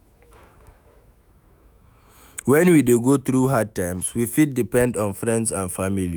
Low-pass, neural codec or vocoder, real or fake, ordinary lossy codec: none; autoencoder, 48 kHz, 128 numbers a frame, DAC-VAE, trained on Japanese speech; fake; none